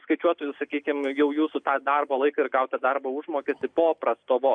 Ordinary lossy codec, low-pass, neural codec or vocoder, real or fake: AAC, 64 kbps; 9.9 kHz; none; real